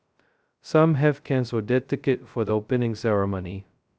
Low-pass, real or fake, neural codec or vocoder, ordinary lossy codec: none; fake; codec, 16 kHz, 0.2 kbps, FocalCodec; none